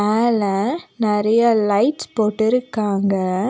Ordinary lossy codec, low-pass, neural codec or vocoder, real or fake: none; none; none; real